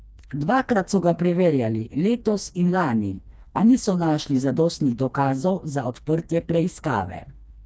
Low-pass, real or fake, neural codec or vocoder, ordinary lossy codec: none; fake; codec, 16 kHz, 2 kbps, FreqCodec, smaller model; none